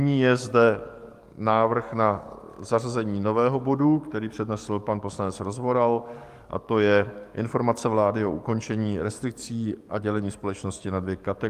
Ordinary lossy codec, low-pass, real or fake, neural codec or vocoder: Opus, 32 kbps; 14.4 kHz; fake; codec, 44.1 kHz, 7.8 kbps, DAC